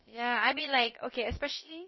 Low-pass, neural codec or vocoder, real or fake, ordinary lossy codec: 7.2 kHz; codec, 16 kHz, about 1 kbps, DyCAST, with the encoder's durations; fake; MP3, 24 kbps